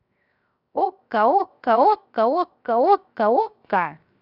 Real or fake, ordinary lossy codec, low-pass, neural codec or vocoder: fake; none; 5.4 kHz; codec, 16 kHz, 0.7 kbps, FocalCodec